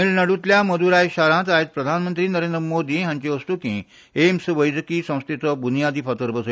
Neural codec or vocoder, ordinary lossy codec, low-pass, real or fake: none; none; none; real